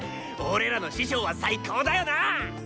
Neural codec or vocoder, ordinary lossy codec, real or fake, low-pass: none; none; real; none